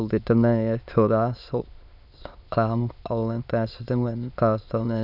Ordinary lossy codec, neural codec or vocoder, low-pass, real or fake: none; autoencoder, 22.05 kHz, a latent of 192 numbers a frame, VITS, trained on many speakers; 5.4 kHz; fake